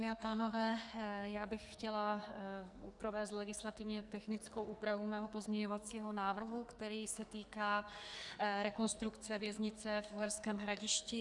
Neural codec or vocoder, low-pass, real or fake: codec, 44.1 kHz, 2.6 kbps, SNAC; 10.8 kHz; fake